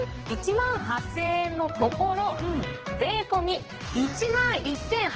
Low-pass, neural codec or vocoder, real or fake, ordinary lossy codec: 7.2 kHz; codec, 16 kHz, 2 kbps, X-Codec, HuBERT features, trained on general audio; fake; Opus, 16 kbps